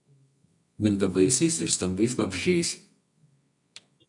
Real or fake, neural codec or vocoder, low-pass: fake; codec, 24 kHz, 0.9 kbps, WavTokenizer, medium music audio release; 10.8 kHz